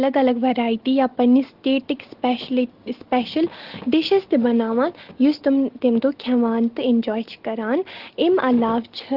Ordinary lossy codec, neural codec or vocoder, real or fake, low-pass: Opus, 16 kbps; none; real; 5.4 kHz